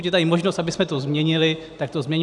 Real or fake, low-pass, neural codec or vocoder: real; 10.8 kHz; none